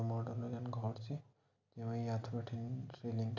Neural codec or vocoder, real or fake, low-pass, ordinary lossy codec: none; real; 7.2 kHz; none